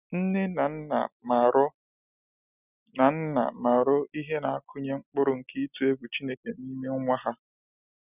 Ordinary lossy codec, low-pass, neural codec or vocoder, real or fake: none; 3.6 kHz; none; real